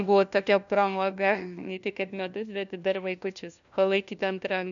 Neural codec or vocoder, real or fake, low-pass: codec, 16 kHz, 1 kbps, FunCodec, trained on LibriTTS, 50 frames a second; fake; 7.2 kHz